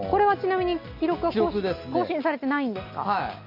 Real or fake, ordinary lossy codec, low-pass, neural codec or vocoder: real; none; 5.4 kHz; none